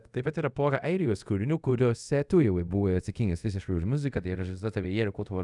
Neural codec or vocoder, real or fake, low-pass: codec, 24 kHz, 0.5 kbps, DualCodec; fake; 10.8 kHz